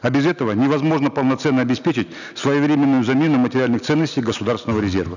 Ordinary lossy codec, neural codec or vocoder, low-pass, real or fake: none; none; 7.2 kHz; real